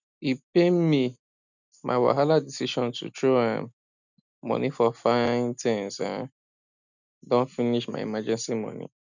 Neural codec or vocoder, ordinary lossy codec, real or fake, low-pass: none; none; real; 7.2 kHz